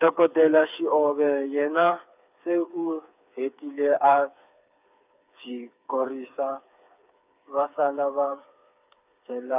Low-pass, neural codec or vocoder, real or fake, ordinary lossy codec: 3.6 kHz; codec, 16 kHz, 4 kbps, FreqCodec, smaller model; fake; none